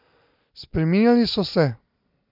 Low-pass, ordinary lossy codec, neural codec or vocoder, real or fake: 5.4 kHz; none; none; real